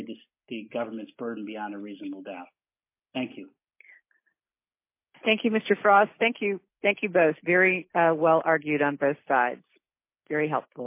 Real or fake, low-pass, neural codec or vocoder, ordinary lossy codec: real; 3.6 kHz; none; MP3, 24 kbps